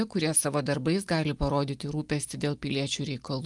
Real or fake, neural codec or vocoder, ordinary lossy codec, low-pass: real; none; Opus, 32 kbps; 10.8 kHz